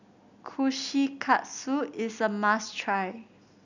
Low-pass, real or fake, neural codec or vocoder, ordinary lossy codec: 7.2 kHz; real; none; none